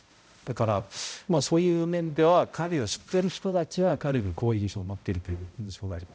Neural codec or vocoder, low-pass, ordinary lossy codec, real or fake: codec, 16 kHz, 0.5 kbps, X-Codec, HuBERT features, trained on balanced general audio; none; none; fake